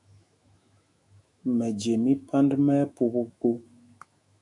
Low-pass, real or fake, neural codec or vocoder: 10.8 kHz; fake; autoencoder, 48 kHz, 128 numbers a frame, DAC-VAE, trained on Japanese speech